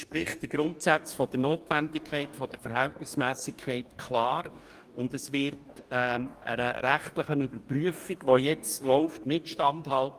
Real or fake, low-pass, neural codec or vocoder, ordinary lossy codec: fake; 14.4 kHz; codec, 44.1 kHz, 2.6 kbps, DAC; Opus, 32 kbps